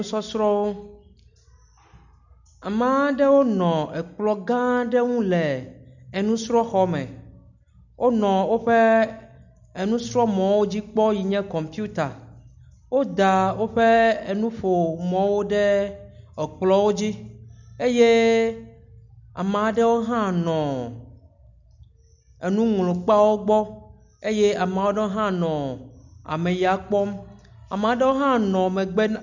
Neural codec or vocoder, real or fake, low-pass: none; real; 7.2 kHz